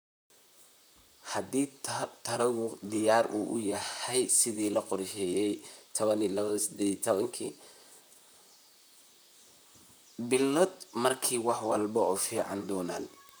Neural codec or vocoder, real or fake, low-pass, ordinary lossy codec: vocoder, 44.1 kHz, 128 mel bands, Pupu-Vocoder; fake; none; none